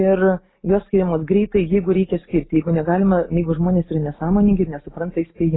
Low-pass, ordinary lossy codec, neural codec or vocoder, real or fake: 7.2 kHz; AAC, 16 kbps; none; real